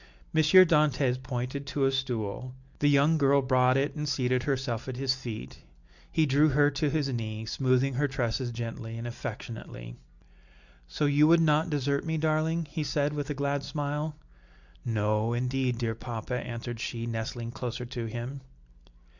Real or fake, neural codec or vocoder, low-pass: real; none; 7.2 kHz